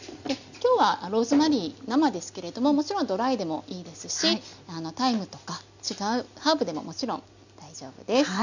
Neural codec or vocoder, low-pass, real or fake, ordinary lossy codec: none; 7.2 kHz; real; none